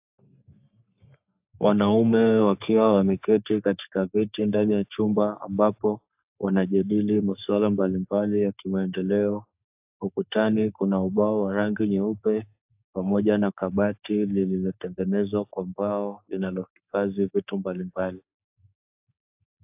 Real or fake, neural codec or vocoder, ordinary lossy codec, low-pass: fake; codec, 44.1 kHz, 3.4 kbps, Pupu-Codec; AAC, 32 kbps; 3.6 kHz